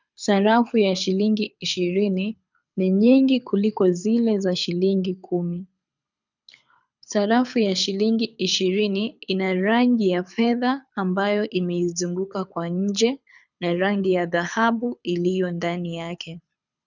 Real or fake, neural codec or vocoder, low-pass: fake; codec, 24 kHz, 6 kbps, HILCodec; 7.2 kHz